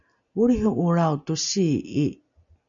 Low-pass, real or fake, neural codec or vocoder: 7.2 kHz; real; none